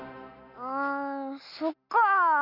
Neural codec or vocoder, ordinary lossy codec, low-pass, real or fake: none; none; 5.4 kHz; real